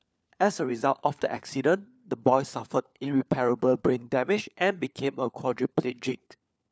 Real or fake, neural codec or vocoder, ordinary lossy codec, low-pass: fake; codec, 16 kHz, 4 kbps, FunCodec, trained on LibriTTS, 50 frames a second; none; none